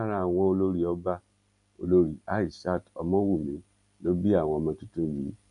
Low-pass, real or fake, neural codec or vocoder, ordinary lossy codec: 10.8 kHz; real; none; none